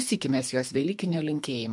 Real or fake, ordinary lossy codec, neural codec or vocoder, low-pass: fake; MP3, 64 kbps; codec, 24 kHz, 3 kbps, HILCodec; 10.8 kHz